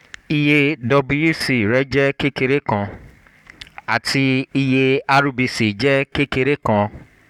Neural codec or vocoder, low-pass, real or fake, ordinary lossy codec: codec, 44.1 kHz, 7.8 kbps, Pupu-Codec; 19.8 kHz; fake; none